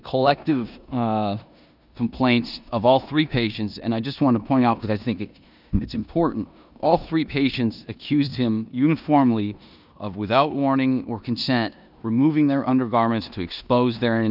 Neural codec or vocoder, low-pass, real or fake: codec, 16 kHz in and 24 kHz out, 0.9 kbps, LongCat-Audio-Codec, four codebook decoder; 5.4 kHz; fake